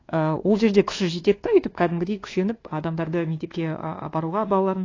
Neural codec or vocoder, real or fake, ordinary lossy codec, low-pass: codec, 24 kHz, 1.2 kbps, DualCodec; fake; AAC, 32 kbps; 7.2 kHz